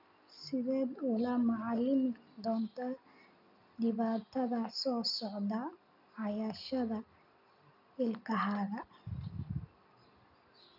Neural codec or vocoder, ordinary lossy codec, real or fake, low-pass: none; none; real; 5.4 kHz